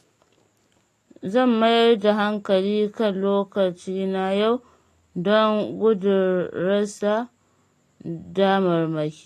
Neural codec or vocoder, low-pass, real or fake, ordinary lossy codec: none; 14.4 kHz; real; AAC, 48 kbps